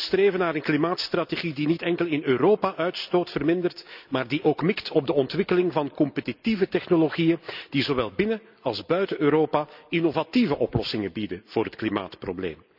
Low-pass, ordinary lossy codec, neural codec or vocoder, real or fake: 5.4 kHz; none; none; real